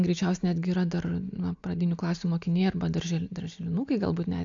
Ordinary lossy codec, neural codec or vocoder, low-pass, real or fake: AAC, 64 kbps; none; 7.2 kHz; real